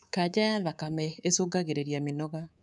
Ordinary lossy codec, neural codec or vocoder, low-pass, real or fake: none; codec, 24 kHz, 3.1 kbps, DualCodec; 10.8 kHz; fake